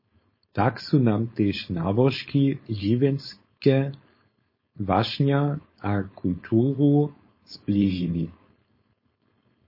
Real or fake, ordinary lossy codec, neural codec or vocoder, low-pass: fake; MP3, 24 kbps; codec, 16 kHz, 4.8 kbps, FACodec; 5.4 kHz